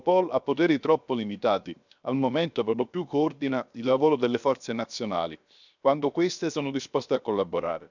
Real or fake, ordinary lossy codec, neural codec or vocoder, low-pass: fake; none; codec, 16 kHz, 0.7 kbps, FocalCodec; 7.2 kHz